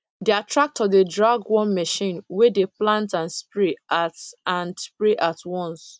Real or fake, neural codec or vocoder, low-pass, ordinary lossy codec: real; none; none; none